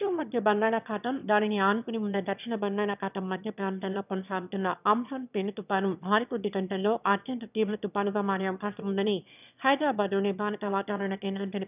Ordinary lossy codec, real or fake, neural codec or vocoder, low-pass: none; fake; autoencoder, 22.05 kHz, a latent of 192 numbers a frame, VITS, trained on one speaker; 3.6 kHz